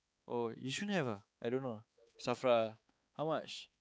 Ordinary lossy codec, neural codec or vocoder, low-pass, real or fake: none; codec, 16 kHz, 4 kbps, X-Codec, HuBERT features, trained on balanced general audio; none; fake